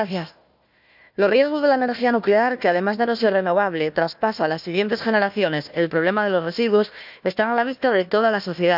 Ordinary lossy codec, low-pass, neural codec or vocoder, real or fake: none; 5.4 kHz; codec, 16 kHz, 1 kbps, FunCodec, trained on Chinese and English, 50 frames a second; fake